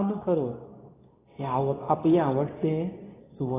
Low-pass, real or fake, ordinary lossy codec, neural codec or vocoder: 3.6 kHz; fake; AAC, 16 kbps; codec, 24 kHz, 0.9 kbps, WavTokenizer, medium speech release version 2